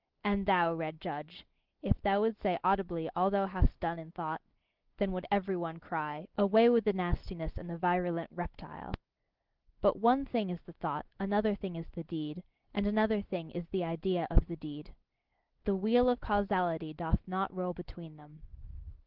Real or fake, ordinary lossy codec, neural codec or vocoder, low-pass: real; Opus, 16 kbps; none; 5.4 kHz